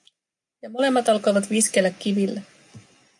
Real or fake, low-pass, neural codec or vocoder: real; 10.8 kHz; none